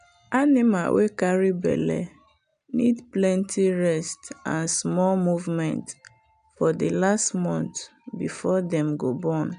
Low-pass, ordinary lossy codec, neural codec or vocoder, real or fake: 9.9 kHz; none; none; real